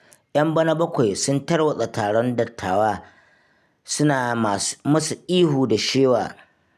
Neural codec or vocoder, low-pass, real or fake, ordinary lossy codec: none; 14.4 kHz; real; none